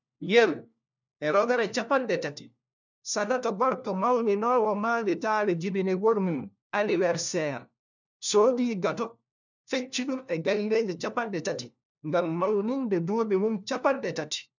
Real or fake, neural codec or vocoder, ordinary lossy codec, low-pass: fake; codec, 16 kHz, 1 kbps, FunCodec, trained on LibriTTS, 50 frames a second; none; 7.2 kHz